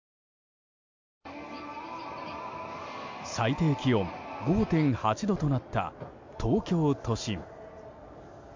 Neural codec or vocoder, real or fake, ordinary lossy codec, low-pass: none; real; MP3, 48 kbps; 7.2 kHz